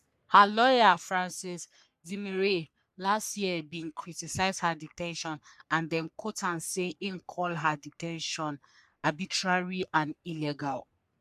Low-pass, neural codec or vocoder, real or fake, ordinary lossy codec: 14.4 kHz; codec, 44.1 kHz, 3.4 kbps, Pupu-Codec; fake; none